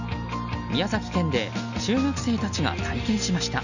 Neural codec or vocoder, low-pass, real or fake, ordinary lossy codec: none; 7.2 kHz; real; none